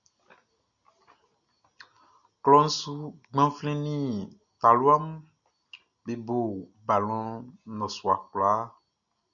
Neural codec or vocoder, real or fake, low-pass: none; real; 7.2 kHz